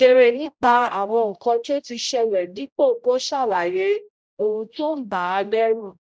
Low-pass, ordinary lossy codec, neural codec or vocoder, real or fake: none; none; codec, 16 kHz, 0.5 kbps, X-Codec, HuBERT features, trained on general audio; fake